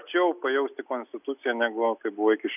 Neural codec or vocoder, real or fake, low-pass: none; real; 3.6 kHz